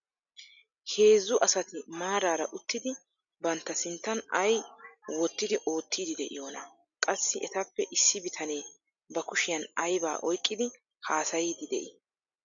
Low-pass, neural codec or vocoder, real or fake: 7.2 kHz; none; real